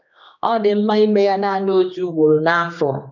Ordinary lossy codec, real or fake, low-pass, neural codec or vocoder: none; fake; 7.2 kHz; codec, 16 kHz, 2 kbps, X-Codec, HuBERT features, trained on general audio